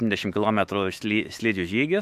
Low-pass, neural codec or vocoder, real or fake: 14.4 kHz; autoencoder, 48 kHz, 128 numbers a frame, DAC-VAE, trained on Japanese speech; fake